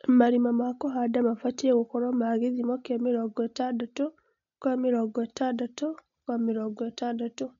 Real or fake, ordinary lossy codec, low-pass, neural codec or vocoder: real; none; 7.2 kHz; none